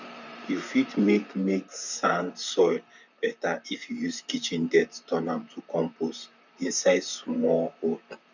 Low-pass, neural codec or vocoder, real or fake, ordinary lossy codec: 7.2 kHz; vocoder, 44.1 kHz, 128 mel bands, Pupu-Vocoder; fake; none